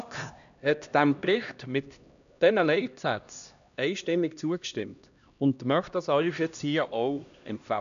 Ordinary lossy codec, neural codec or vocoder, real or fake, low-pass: none; codec, 16 kHz, 1 kbps, X-Codec, HuBERT features, trained on LibriSpeech; fake; 7.2 kHz